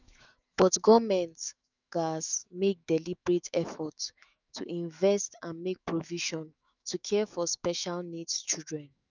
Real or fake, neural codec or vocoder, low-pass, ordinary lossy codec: fake; autoencoder, 48 kHz, 128 numbers a frame, DAC-VAE, trained on Japanese speech; 7.2 kHz; none